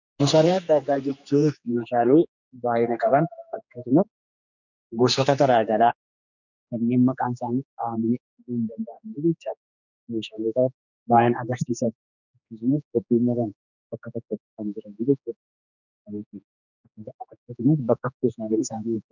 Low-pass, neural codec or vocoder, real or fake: 7.2 kHz; codec, 16 kHz, 2 kbps, X-Codec, HuBERT features, trained on general audio; fake